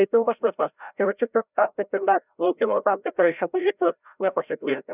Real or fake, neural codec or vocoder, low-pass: fake; codec, 16 kHz, 0.5 kbps, FreqCodec, larger model; 3.6 kHz